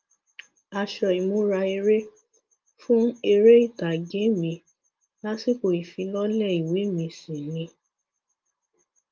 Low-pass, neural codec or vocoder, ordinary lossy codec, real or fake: 7.2 kHz; none; Opus, 32 kbps; real